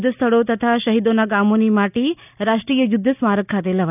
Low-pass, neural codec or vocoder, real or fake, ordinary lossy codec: 3.6 kHz; none; real; none